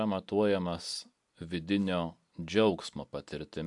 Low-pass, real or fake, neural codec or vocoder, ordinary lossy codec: 10.8 kHz; real; none; MP3, 64 kbps